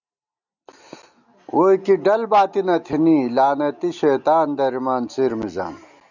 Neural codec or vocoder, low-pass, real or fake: none; 7.2 kHz; real